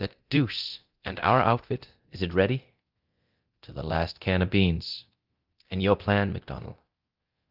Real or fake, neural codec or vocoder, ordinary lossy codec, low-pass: fake; codec, 24 kHz, 0.9 kbps, DualCodec; Opus, 32 kbps; 5.4 kHz